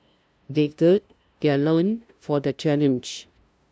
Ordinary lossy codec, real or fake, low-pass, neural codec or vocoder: none; fake; none; codec, 16 kHz, 0.5 kbps, FunCodec, trained on LibriTTS, 25 frames a second